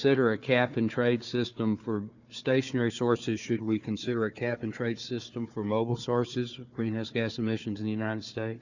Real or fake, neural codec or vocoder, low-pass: fake; codec, 16 kHz, 4 kbps, FunCodec, trained on Chinese and English, 50 frames a second; 7.2 kHz